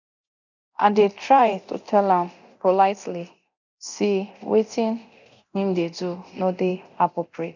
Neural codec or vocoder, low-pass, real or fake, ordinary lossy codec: codec, 24 kHz, 0.9 kbps, DualCodec; 7.2 kHz; fake; none